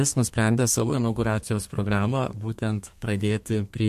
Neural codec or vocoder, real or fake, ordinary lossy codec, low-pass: codec, 32 kHz, 1.9 kbps, SNAC; fake; MP3, 64 kbps; 14.4 kHz